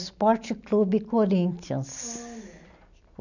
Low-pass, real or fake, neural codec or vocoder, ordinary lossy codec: 7.2 kHz; real; none; none